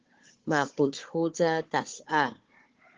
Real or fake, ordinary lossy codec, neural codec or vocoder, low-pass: fake; Opus, 16 kbps; codec, 16 kHz, 4 kbps, FunCodec, trained on Chinese and English, 50 frames a second; 7.2 kHz